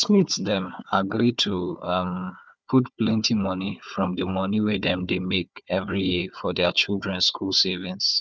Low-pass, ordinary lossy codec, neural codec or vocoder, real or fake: none; none; codec, 16 kHz, 4 kbps, FunCodec, trained on Chinese and English, 50 frames a second; fake